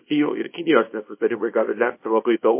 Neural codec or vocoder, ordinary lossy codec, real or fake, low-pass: codec, 24 kHz, 0.9 kbps, WavTokenizer, small release; MP3, 24 kbps; fake; 3.6 kHz